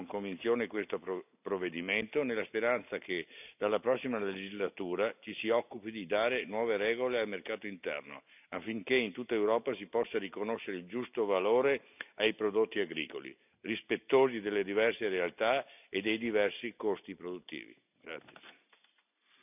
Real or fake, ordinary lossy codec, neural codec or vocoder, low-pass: real; none; none; 3.6 kHz